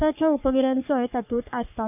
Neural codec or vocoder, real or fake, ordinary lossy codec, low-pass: codec, 44.1 kHz, 3.4 kbps, Pupu-Codec; fake; none; 3.6 kHz